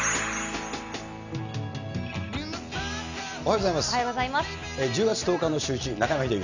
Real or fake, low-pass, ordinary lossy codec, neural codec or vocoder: real; 7.2 kHz; none; none